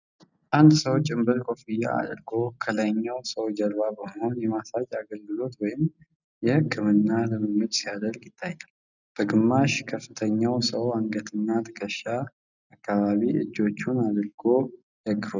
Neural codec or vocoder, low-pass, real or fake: none; 7.2 kHz; real